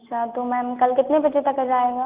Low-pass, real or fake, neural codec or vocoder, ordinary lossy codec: 3.6 kHz; real; none; Opus, 32 kbps